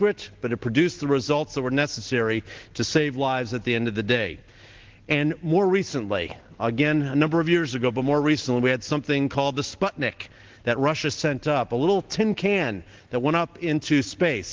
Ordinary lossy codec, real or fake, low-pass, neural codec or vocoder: Opus, 16 kbps; real; 7.2 kHz; none